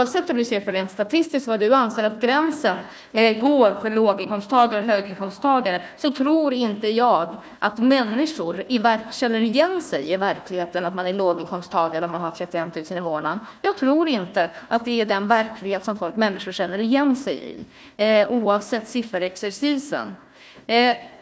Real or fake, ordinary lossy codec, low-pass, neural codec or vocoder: fake; none; none; codec, 16 kHz, 1 kbps, FunCodec, trained on Chinese and English, 50 frames a second